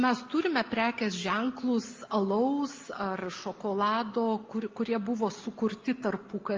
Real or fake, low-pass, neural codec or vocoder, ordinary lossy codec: real; 7.2 kHz; none; Opus, 32 kbps